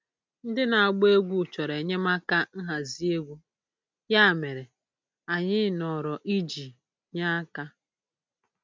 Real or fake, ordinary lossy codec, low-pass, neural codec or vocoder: real; none; none; none